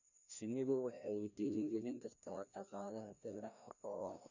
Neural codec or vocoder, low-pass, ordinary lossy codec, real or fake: codec, 16 kHz, 1 kbps, FreqCodec, larger model; 7.2 kHz; none; fake